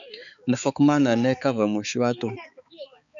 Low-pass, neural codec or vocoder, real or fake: 7.2 kHz; codec, 16 kHz, 4 kbps, X-Codec, HuBERT features, trained on balanced general audio; fake